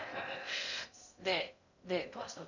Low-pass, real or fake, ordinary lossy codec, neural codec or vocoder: 7.2 kHz; fake; none; codec, 16 kHz in and 24 kHz out, 0.6 kbps, FocalCodec, streaming, 2048 codes